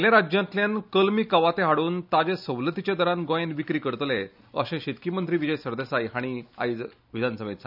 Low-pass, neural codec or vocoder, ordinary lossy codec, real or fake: 5.4 kHz; none; none; real